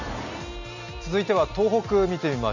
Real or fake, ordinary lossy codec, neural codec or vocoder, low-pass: real; none; none; 7.2 kHz